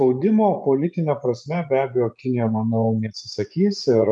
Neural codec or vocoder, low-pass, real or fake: vocoder, 24 kHz, 100 mel bands, Vocos; 10.8 kHz; fake